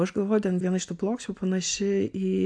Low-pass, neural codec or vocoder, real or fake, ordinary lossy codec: 9.9 kHz; none; real; AAC, 64 kbps